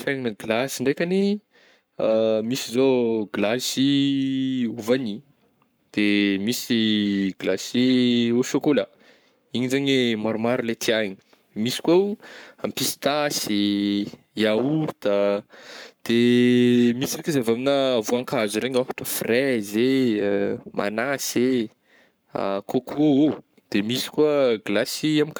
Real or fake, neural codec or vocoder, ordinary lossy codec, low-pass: fake; codec, 44.1 kHz, 7.8 kbps, Pupu-Codec; none; none